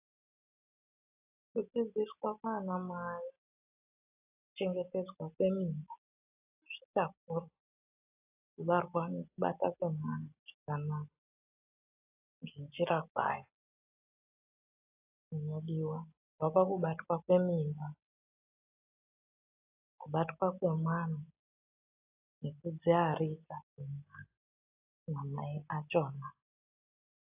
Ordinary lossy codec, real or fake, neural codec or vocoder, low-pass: AAC, 32 kbps; real; none; 3.6 kHz